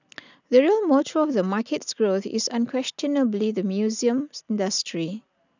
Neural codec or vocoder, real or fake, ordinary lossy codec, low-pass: none; real; none; 7.2 kHz